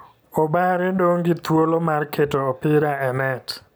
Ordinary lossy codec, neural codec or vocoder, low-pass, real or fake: none; none; none; real